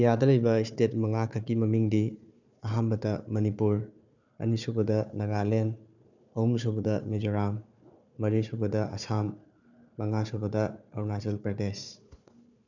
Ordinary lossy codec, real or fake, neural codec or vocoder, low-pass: none; fake; codec, 16 kHz, 4 kbps, FunCodec, trained on Chinese and English, 50 frames a second; 7.2 kHz